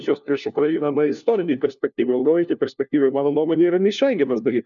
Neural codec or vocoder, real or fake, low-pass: codec, 16 kHz, 1 kbps, FunCodec, trained on LibriTTS, 50 frames a second; fake; 7.2 kHz